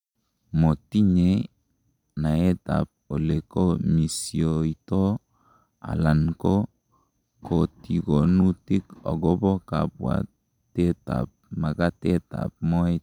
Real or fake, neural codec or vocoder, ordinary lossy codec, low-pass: real; none; none; 19.8 kHz